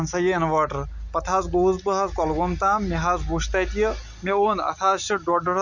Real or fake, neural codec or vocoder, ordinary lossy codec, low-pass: real; none; none; 7.2 kHz